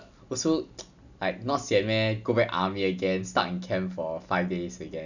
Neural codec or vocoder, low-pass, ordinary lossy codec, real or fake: none; 7.2 kHz; none; real